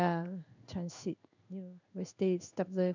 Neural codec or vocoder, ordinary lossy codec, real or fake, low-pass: codec, 16 kHz in and 24 kHz out, 1 kbps, XY-Tokenizer; none; fake; 7.2 kHz